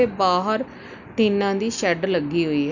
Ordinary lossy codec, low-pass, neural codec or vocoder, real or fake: none; 7.2 kHz; none; real